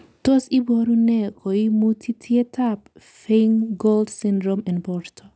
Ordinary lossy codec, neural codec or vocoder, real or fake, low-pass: none; none; real; none